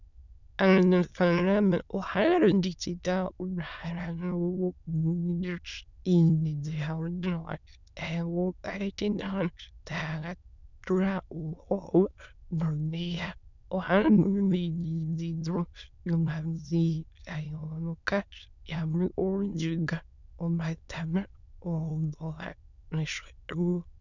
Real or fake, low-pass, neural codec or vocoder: fake; 7.2 kHz; autoencoder, 22.05 kHz, a latent of 192 numbers a frame, VITS, trained on many speakers